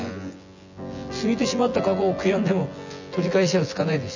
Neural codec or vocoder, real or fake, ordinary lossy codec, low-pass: vocoder, 24 kHz, 100 mel bands, Vocos; fake; none; 7.2 kHz